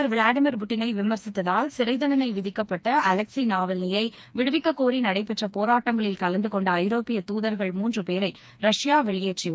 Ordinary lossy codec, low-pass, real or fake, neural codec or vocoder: none; none; fake; codec, 16 kHz, 2 kbps, FreqCodec, smaller model